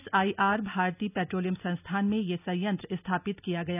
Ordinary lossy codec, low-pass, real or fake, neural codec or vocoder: none; 3.6 kHz; real; none